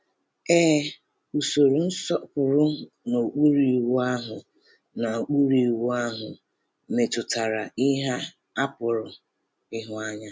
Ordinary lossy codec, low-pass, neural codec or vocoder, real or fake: none; none; none; real